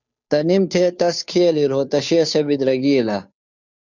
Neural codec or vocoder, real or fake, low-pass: codec, 16 kHz, 2 kbps, FunCodec, trained on Chinese and English, 25 frames a second; fake; 7.2 kHz